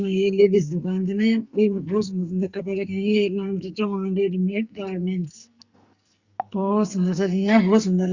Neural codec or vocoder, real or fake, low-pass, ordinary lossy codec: codec, 44.1 kHz, 2.6 kbps, SNAC; fake; 7.2 kHz; Opus, 64 kbps